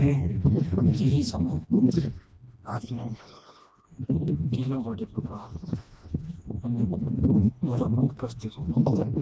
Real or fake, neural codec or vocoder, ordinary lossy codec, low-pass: fake; codec, 16 kHz, 1 kbps, FreqCodec, smaller model; none; none